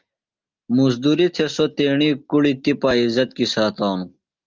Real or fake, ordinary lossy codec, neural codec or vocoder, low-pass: real; Opus, 32 kbps; none; 7.2 kHz